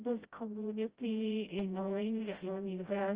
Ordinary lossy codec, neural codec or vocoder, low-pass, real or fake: Opus, 24 kbps; codec, 16 kHz, 0.5 kbps, FreqCodec, smaller model; 3.6 kHz; fake